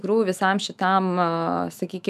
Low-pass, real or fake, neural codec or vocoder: 14.4 kHz; fake; autoencoder, 48 kHz, 128 numbers a frame, DAC-VAE, trained on Japanese speech